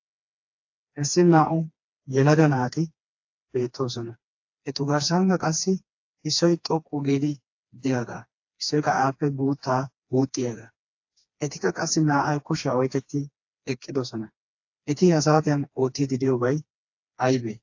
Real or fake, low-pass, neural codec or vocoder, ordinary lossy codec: fake; 7.2 kHz; codec, 16 kHz, 2 kbps, FreqCodec, smaller model; AAC, 48 kbps